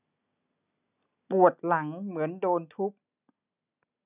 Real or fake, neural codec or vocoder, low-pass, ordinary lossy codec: real; none; 3.6 kHz; none